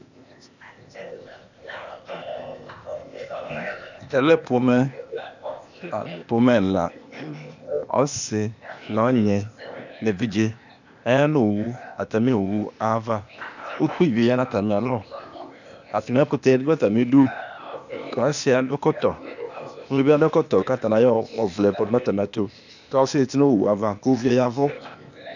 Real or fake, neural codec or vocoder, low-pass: fake; codec, 16 kHz, 0.8 kbps, ZipCodec; 7.2 kHz